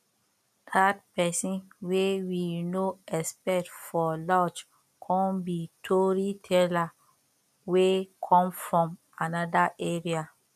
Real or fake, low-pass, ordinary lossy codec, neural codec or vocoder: real; 14.4 kHz; none; none